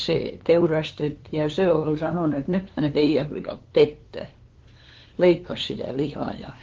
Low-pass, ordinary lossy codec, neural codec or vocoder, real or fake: 7.2 kHz; Opus, 16 kbps; codec, 16 kHz, 2 kbps, FunCodec, trained on LibriTTS, 25 frames a second; fake